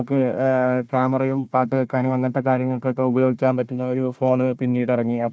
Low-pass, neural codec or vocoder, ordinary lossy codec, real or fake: none; codec, 16 kHz, 1 kbps, FunCodec, trained on Chinese and English, 50 frames a second; none; fake